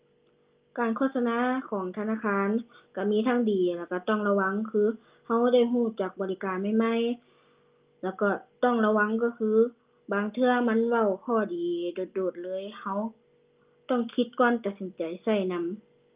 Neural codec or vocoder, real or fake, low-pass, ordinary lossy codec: none; real; 3.6 kHz; Opus, 32 kbps